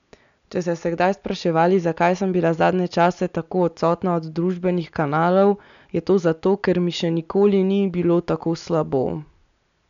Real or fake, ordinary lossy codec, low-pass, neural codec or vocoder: real; none; 7.2 kHz; none